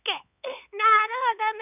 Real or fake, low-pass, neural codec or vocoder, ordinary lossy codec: fake; 3.6 kHz; codec, 16 kHz, 4 kbps, X-Codec, HuBERT features, trained on LibriSpeech; none